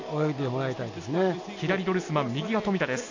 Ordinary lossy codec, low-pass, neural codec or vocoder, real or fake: AAC, 48 kbps; 7.2 kHz; none; real